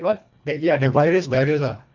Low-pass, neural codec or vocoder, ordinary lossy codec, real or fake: 7.2 kHz; codec, 24 kHz, 1.5 kbps, HILCodec; none; fake